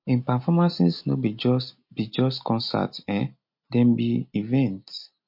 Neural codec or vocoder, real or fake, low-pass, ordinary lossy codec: none; real; 5.4 kHz; MP3, 32 kbps